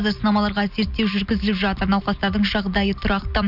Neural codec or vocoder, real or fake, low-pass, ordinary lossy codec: none; real; 5.4 kHz; none